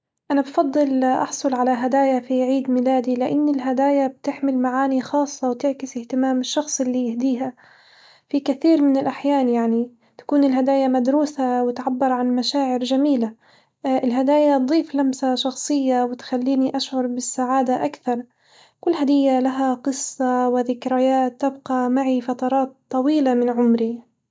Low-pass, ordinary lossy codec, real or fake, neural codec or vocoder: none; none; real; none